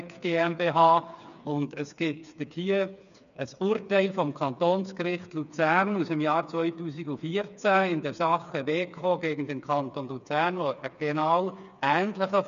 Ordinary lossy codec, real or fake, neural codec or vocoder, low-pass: none; fake; codec, 16 kHz, 4 kbps, FreqCodec, smaller model; 7.2 kHz